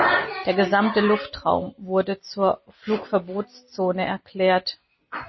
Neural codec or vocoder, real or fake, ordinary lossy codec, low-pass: none; real; MP3, 24 kbps; 7.2 kHz